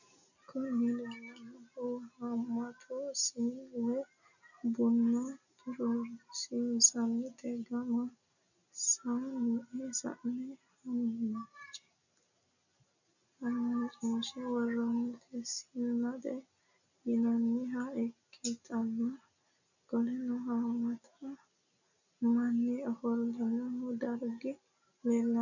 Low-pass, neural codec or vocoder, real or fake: 7.2 kHz; none; real